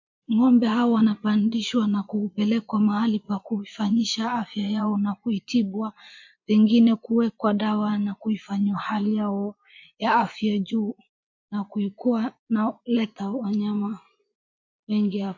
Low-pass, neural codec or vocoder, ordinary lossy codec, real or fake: 7.2 kHz; vocoder, 24 kHz, 100 mel bands, Vocos; MP3, 48 kbps; fake